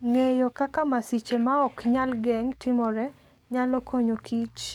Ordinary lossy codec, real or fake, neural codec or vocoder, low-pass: none; fake; codec, 44.1 kHz, 7.8 kbps, DAC; 19.8 kHz